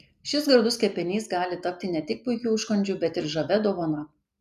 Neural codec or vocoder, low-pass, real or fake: none; 14.4 kHz; real